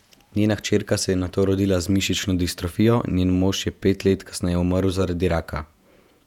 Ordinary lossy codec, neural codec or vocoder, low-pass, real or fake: none; vocoder, 48 kHz, 128 mel bands, Vocos; 19.8 kHz; fake